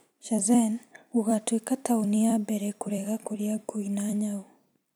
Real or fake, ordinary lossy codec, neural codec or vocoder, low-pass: fake; none; vocoder, 44.1 kHz, 128 mel bands every 256 samples, BigVGAN v2; none